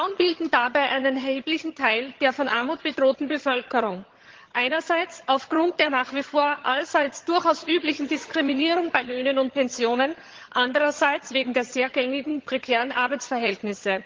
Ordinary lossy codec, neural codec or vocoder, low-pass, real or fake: Opus, 16 kbps; vocoder, 22.05 kHz, 80 mel bands, HiFi-GAN; 7.2 kHz; fake